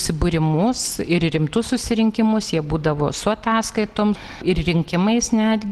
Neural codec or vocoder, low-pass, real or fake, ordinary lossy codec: none; 14.4 kHz; real; Opus, 16 kbps